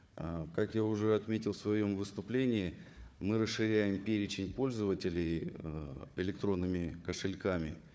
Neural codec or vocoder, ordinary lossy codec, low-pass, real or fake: codec, 16 kHz, 4 kbps, FunCodec, trained on Chinese and English, 50 frames a second; none; none; fake